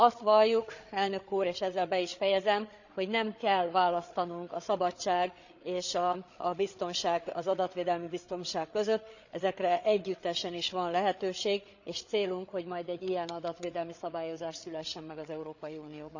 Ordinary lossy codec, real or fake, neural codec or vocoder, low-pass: none; fake; codec, 16 kHz, 8 kbps, FreqCodec, larger model; 7.2 kHz